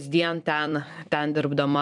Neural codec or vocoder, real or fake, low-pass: vocoder, 44.1 kHz, 128 mel bands every 256 samples, BigVGAN v2; fake; 10.8 kHz